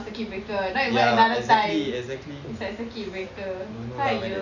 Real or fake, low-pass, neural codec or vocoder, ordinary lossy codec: real; 7.2 kHz; none; none